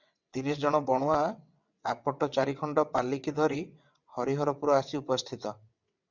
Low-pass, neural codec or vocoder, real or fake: 7.2 kHz; vocoder, 22.05 kHz, 80 mel bands, WaveNeXt; fake